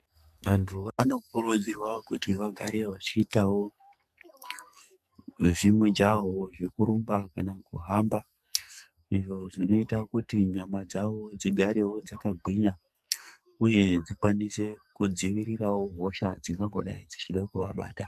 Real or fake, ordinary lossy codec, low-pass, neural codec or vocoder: fake; MP3, 96 kbps; 14.4 kHz; codec, 44.1 kHz, 2.6 kbps, SNAC